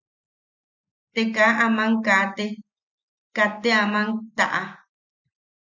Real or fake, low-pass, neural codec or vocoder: real; 7.2 kHz; none